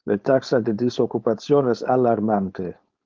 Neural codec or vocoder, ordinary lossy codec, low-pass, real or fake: codec, 16 kHz, 4.8 kbps, FACodec; Opus, 32 kbps; 7.2 kHz; fake